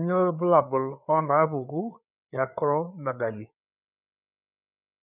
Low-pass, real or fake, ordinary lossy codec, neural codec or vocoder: 3.6 kHz; fake; none; codec, 16 kHz, 4 kbps, FreqCodec, larger model